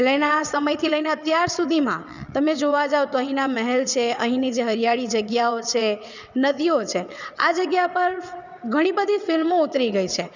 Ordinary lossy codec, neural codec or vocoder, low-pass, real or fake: none; vocoder, 22.05 kHz, 80 mel bands, WaveNeXt; 7.2 kHz; fake